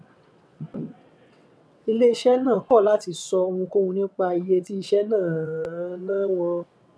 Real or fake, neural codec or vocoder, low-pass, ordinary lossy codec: fake; vocoder, 22.05 kHz, 80 mel bands, WaveNeXt; none; none